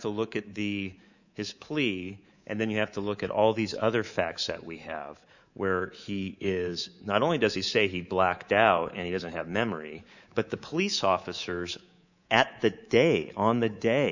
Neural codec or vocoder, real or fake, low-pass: codec, 24 kHz, 3.1 kbps, DualCodec; fake; 7.2 kHz